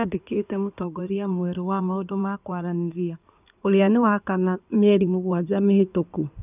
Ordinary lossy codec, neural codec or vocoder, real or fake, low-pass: none; codec, 16 kHz in and 24 kHz out, 2.2 kbps, FireRedTTS-2 codec; fake; 3.6 kHz